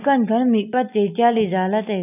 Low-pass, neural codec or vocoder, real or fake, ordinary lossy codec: 3.6 kHz; none; real; none